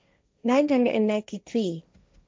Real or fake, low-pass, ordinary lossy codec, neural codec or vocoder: fake; none; none; codec, 16 kHz, 1.1 kbps, Voila-Tokenizer